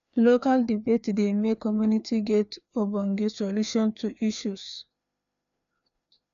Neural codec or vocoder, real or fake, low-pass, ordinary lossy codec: codec, 16 kHz, 2 kbps, FreqCodec, larger model; fake; 7.2 kHz; none